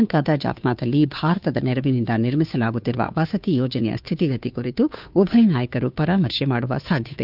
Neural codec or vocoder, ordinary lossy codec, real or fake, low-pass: codec, 16 kHz, 2 kbps, FunCodec, trained on Chinese and English, 25 frames a second; none; fake; 5.4 kHz